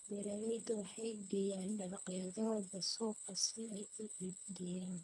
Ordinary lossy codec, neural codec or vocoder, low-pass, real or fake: none; codec, 24 kHz, 3 kbps, HILCodec; 10.8 kHz; fake